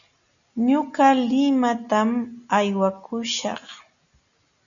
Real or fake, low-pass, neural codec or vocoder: real; 7.2 kHz; none